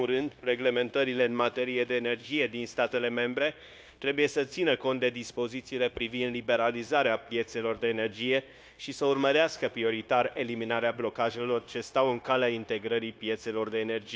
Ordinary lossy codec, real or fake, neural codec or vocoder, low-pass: none; fake; codec, 16 kHz, 0.9 kbps, LongCat-Audio-Codec; none